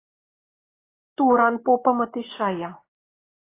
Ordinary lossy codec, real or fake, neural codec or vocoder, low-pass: AAC, 24 kbps; real; none; 3.6 kHz